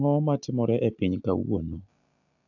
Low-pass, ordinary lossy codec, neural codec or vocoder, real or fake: 7.2 kHz; AAC, 48 kbps; none; real